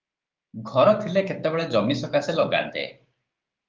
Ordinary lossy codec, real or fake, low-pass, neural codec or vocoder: Opus, 32 kbps; fake; 7.2 kHz; codec, 16 kHz, 6 kbps, DAC